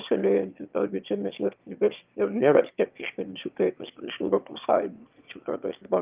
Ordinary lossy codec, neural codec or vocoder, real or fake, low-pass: Opus, 32 kbps; autoencoder, 22.05 kHz, a latent of 192 numbers a frame, VITS, trained on one speaker; fake; 3.6 kHz